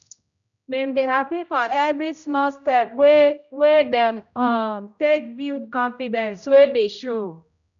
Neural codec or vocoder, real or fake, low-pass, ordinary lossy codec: codec, 16 kHz, 0.5 kbps, X-Codec, HuBERT features, trained on general audio; fake; 7.2 kHz; none